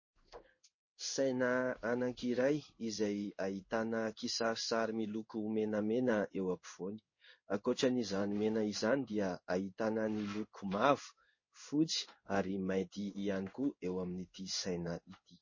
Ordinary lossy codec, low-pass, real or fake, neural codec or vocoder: MP3, 32 kbps; 7.2 kHz; fake; codec, 16 kHz in and 24 kHz out, 1 kbps, XY-Tokenizer